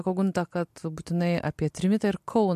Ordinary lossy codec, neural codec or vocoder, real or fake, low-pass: MP3, 64 kbps; none; real; 14.4 kHz